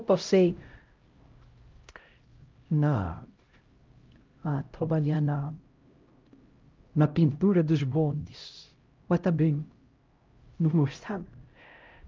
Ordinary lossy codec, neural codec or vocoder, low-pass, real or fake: Opus, 24 kbps; codec, 16 kHz, 0.5 kbps, X-Codec, HuBERT features, trained on LibriSpeech; 7.2 kHz; fake